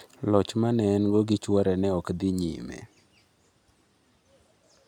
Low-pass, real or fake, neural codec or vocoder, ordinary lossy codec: 19.8 kHz; real; none; none